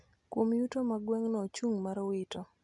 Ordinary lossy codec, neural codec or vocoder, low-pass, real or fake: none; none; 10.8 kHz; real